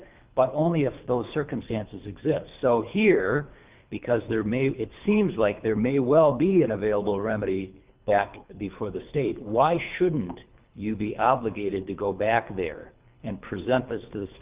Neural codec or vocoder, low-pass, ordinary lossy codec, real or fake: codec, 24 kHz, 3 kbps, HILCodec; 3.6 kHz; Opus, 24 kbps; fake